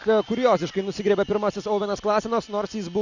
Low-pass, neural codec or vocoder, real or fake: 7.2 kHz; none; real